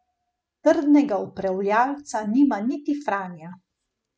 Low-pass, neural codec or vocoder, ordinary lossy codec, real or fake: none; none; none; real